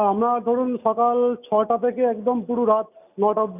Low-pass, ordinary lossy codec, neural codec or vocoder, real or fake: 3.6 kHz; none; none; real